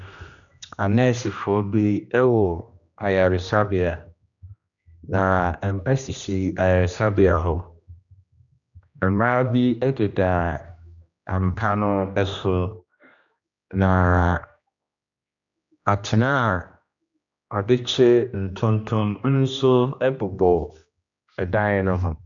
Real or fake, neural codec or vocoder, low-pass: fake; codec, 16 kHz, 1 kbps, X-Codec, HuBERT features, trained on general audio; 7.2 kHz